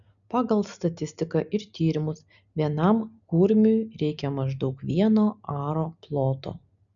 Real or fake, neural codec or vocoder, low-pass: real; none; 7.2 kHz